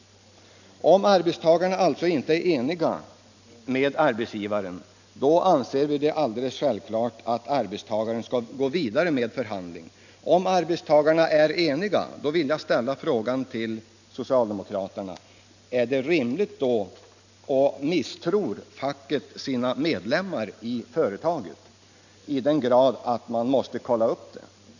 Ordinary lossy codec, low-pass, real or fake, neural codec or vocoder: none; 7.2 kHz; real; none